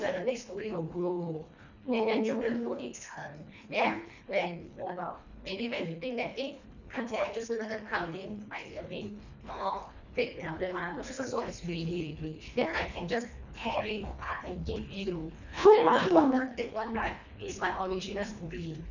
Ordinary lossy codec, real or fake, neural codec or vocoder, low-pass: none; fake; codec, 24 kHz, 1.5 kbps, HILCodec; 7.2 kHz